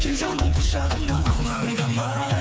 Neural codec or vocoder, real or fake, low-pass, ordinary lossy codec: codec, 16 kHz, 4 kbps, FreqCodec, smaller model; fake; none; none